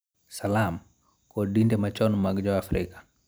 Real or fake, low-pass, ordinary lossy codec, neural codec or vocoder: real; none; none; none